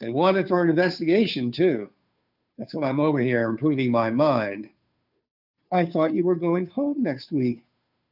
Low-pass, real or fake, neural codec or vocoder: 5.4 kHz; fake; codec, 16 kHz, 2 kbps, FunCodec, trained on Chinese and English, 25 frames a second